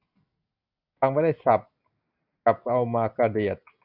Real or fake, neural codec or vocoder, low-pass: real; none; 5.4 kHz